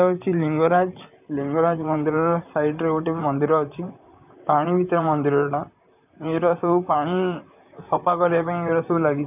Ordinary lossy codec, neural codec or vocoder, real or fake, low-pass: none; vocoder, 44.1 kHz, 128 mel bands, Pupu-Vocoder; fake; 3.6 kHz